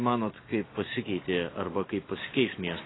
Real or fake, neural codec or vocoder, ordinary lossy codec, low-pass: real; none; AAC, 16 kbps; 7.2 kHz